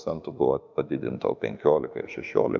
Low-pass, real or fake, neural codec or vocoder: 7.2 kHz; fake; autoencoder, 48 kHz, 32 numbers a frame, DAC-VAE, trained on Japanese speech